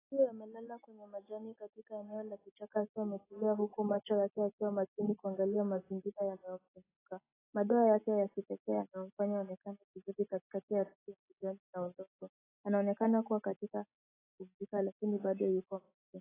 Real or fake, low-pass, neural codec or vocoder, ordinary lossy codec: real; 3.6 kHz; none; AAC, 16 kbps